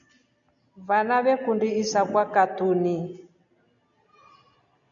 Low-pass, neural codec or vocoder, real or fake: 7.2 kHz; none; real